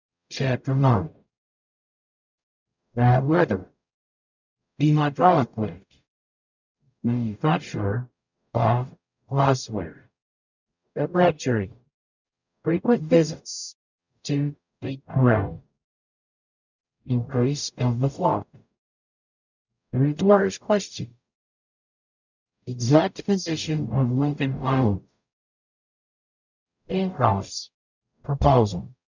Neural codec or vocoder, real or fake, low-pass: codec, 44.1 kHz, 0.9 kbps, DAC; fake; 7.2 kHz